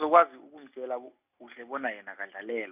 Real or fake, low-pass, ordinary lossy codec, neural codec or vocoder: real; 3.6 kHz; none; none